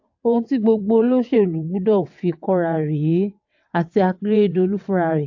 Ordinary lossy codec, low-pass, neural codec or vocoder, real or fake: AAC, 48 kbps; 7.2 kHz; vocoder, 22.05 kHz, 80 mel bands, WaveNeXt; fake